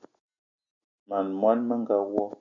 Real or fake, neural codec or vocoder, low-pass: real; none; 7.2 kHz